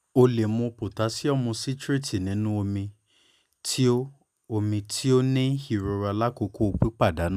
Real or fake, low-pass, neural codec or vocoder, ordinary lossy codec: real; 14.4 kHz; none; none